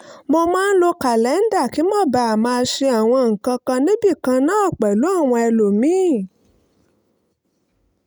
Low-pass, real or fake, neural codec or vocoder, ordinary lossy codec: none; real; none; none